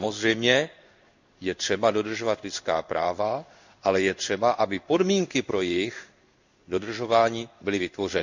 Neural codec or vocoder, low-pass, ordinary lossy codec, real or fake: codec, 16 kHz in and 24 kHz out, 1 kbps, XY-Tokenizer; 7.2 kHz; none; fake